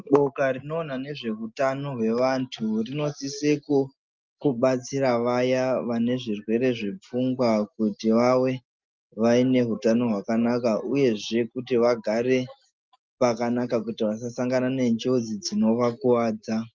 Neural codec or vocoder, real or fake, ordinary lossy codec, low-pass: none; real; Opus, 32 kbps; 7.2 kHz